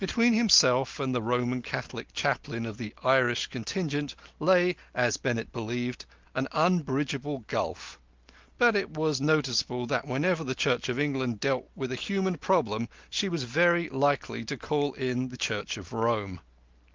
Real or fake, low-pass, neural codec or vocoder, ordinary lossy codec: real; 7.2 kHz; none; Opus, 32 kbps